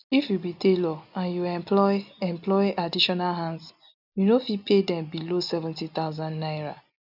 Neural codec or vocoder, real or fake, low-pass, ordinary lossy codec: none; real; 5.4 kHz; none